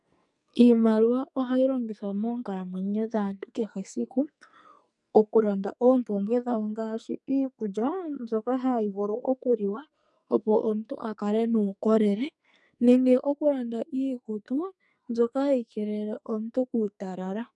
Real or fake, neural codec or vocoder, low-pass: fake; codec, 44.1 kHz, 2.6 kbps, SNAC; 10.8 kHz